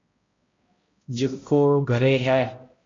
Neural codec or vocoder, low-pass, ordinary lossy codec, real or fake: codec, 16 kHz, 0.5 kbps, X-Codec, HuBERT features, trained on balanced general audio; 7.2 kHz; AAC, 32 kbps; fake